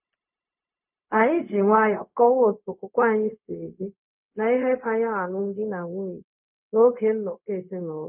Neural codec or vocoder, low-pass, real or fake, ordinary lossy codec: codec, 16 kHz, 0.4 kbps, LongCat-Audio-Codec; 3.6 kHz; fake; none